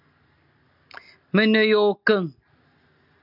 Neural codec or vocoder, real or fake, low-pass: none; real; 5.4 kHz